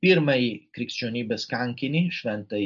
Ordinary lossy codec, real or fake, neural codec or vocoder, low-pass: MP3, 64 kbps; real; none; 7.2 kHz